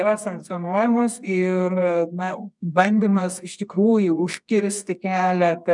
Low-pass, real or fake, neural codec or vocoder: 10.8 kHz; fake; codec, 24 kHz, 0.9 kbps, WavTokenizer, medium music audio release